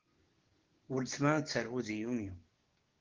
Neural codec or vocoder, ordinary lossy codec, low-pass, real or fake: codec, 16 kHz, 6 kbps, DAC; Opus, 16 kbps; 7.2 kHz; fake